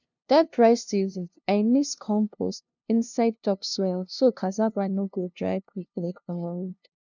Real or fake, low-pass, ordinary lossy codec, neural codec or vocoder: fake; 7.2 kHz; none; codec, 16 kHz, 0.5 kbps, FunCodec, trained on LibriTTS, 25 frames a second